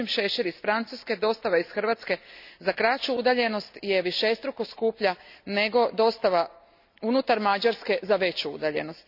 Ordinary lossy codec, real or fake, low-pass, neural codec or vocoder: none; real; 5.4 kHz; none